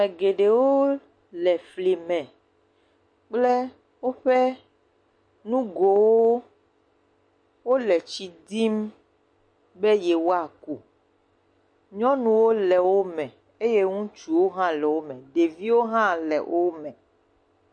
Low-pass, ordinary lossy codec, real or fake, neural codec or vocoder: 9.9 kHz; MP3, 48 kbps; real; none